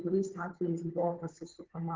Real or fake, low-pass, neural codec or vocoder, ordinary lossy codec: fake; 7.2 kHz; codec, 16 kHz, 8 kbps, FunCodec, trained on Chinese and English, 25 frames a second; Opus, 16 kbps